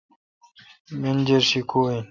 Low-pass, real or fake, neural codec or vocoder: 7.2 kHz; real; none